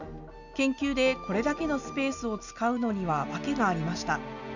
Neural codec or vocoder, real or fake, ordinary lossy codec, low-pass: none; real; none; 7.2 kHz